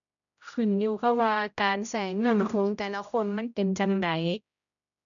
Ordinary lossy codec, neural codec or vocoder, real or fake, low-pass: none; codec, 16 kHz, 0.5 kbps, X-Codec, HuBERT features, trained on general audio; fake; 7.2 kHz